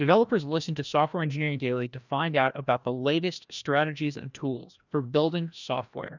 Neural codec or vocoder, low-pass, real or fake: codec, 16 kHz, 1 kbps, FreqCodec, larger model; 7.2 kHz; fake